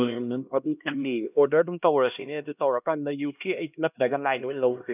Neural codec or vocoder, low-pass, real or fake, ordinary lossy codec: codec, 16 kHz, 1 kbps, X-Codec, HuBERT features, trained on LibriSpeech; 3.6 kHz; fake; none